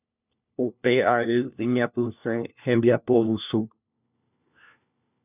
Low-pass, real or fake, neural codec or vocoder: 3.6 kHz; fake; codec, 16 kHz, 1 kbps, FunCodec, trained on LibriTTS, 50 frames a second